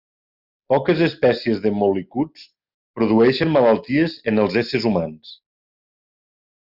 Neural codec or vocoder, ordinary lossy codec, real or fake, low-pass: none; Opus, 64 kbps; real; 5.4 kHz